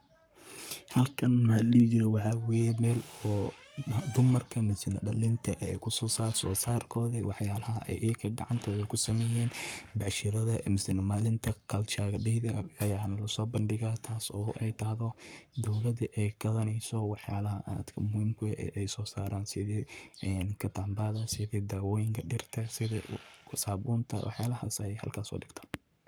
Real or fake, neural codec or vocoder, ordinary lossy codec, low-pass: fake; codec, 44.1 kHz, 7.8 kbps, Pupu-Codec; none; none